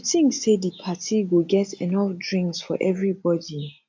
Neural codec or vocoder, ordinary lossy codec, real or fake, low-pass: none; AAC, 48 kbps; real; 7.2 kHz